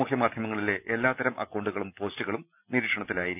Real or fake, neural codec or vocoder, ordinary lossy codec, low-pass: real; none; none; 3.6 kHz